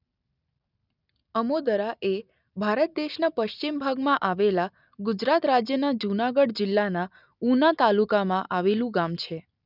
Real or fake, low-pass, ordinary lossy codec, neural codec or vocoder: real; 5.4 kHz; none; none